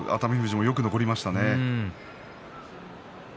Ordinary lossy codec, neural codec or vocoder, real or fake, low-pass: none; none; real; none